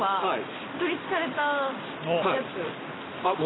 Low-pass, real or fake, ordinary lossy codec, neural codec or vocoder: 7.2 kHz; real; AAC, 16 kbps; none